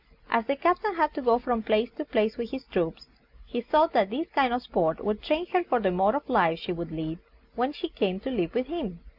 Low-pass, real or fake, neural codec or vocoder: 5.4 kHz; real; none